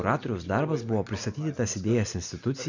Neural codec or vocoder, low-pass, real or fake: none; 7.2 kHz; real